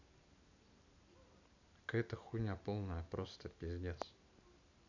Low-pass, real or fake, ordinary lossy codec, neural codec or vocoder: 7.2 kHz; real; none; none